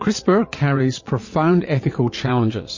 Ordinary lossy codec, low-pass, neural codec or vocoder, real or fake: MP3, 32 kbps; 7.2 kHz; vocoder, 22.05 kHz, 80 mel bands, Vocos; fake